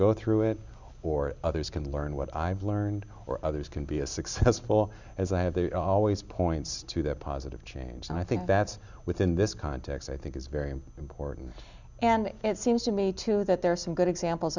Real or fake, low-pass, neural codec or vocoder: real; 7.2 kHz; none